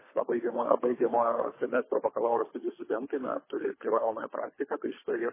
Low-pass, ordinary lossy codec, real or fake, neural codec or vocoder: 3.6 kHz; MP3, 16 kbps; fake; codec, 24 kHz, 3 kbps, HILCodec